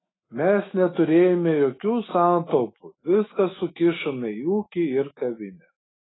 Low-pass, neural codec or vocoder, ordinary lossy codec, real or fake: 7.2 kHz; autoencoder, 48 kHz, 128 numbers a frame, DAC-VAE, trained on Japanese speech; AAC, 16 kbps; fake